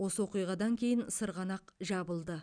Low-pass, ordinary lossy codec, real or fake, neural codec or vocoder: 9.9 kHz; none; real; none